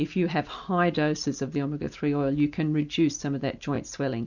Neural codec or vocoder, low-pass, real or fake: none; 7.2 kHz; real